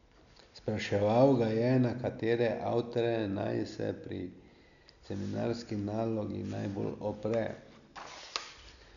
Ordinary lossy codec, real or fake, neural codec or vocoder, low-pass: none; real; none; 7.2 kHz